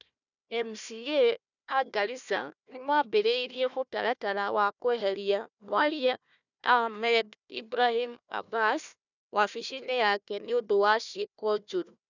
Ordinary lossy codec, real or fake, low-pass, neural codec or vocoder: none; fake; 7.2 kHz; codec, 16 kHz, 1 kbps, FunCodec, trained on Chinese and English, 50 frames a second